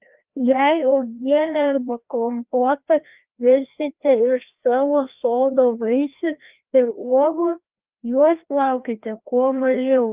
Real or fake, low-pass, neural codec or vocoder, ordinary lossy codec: fake; 3.6 kHz; codec, 16 kHz, 1 kbps, FreqCodec, larger model; Opus, 24 kbps